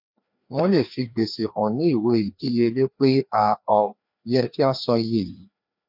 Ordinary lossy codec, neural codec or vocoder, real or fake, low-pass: none; codec, 16 kHz, 2 kbps, FreqCodec, larger model; fake; 5.4 kHz